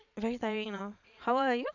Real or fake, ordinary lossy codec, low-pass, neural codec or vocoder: fake; none; 7.2 kHz; vocoder, 22.05 kHz, 80 mel bands, Vocos